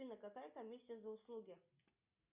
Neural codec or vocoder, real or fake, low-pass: none; real; 3.6 kHz